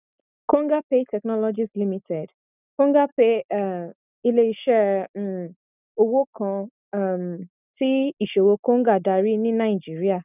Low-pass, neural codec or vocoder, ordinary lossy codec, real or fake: 3.6 kHz; none; none; real